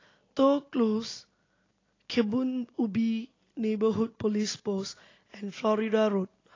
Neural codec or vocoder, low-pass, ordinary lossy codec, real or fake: none; 7.2 kHz; AAC, 32 kbps; real